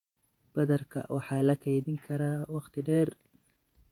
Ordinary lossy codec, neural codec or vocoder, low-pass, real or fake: MP3, 96 kbps; vocoder, 48 kHz, 128 mel bands, Vocos; 19.8 kHz; fake